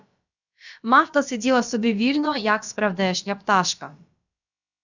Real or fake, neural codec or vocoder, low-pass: fake; codec, 16 kHz, about 1 kbps, DyCAST, with the encoder's durations; 7.2 kHz